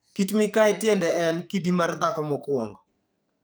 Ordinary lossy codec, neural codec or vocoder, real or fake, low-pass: none; codec, 44.1 kHz, 2.6 kbps, SNAC; fake; none